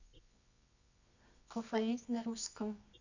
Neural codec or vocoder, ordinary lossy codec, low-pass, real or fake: codec, 24 kHz, 0.9 kbps, WavTokenizer, medium music audio release; none; 7.2 kHz; fake